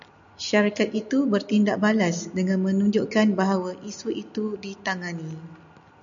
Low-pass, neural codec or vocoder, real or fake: 7.2 kHz; none; real